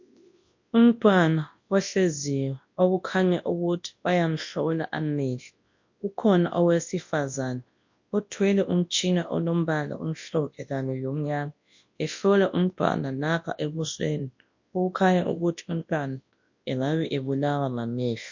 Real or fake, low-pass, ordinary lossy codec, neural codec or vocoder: fake; 7.2 kHz; MP3, 48 kbps; codec, 24 kHz, 0.9 kbps, WavTokenizer, large speech release